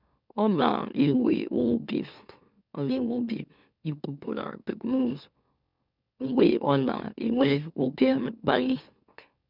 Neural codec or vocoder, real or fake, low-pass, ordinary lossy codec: autoencoder, 44.1 kHz, a latent of 192 numbers a frame, MeloTTS; fake; 5.4 kHz; none